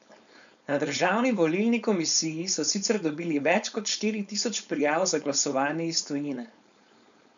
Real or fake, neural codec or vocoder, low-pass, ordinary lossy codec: fake; codec, 16 kHz, 4.8 kbps, FACodec; 7.2 kHz; none